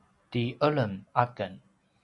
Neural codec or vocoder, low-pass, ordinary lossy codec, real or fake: none; 10.8 kHz; MP3, 64 kbps; real